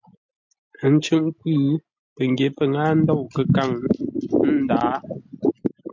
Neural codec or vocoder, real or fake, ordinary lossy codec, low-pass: none; real; MP3, 64 kbps; 7.2 kHz